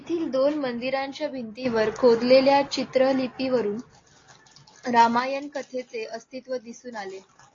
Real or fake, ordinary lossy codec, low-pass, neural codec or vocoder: real; AAC, 32 kbps; 7.2 kHz; none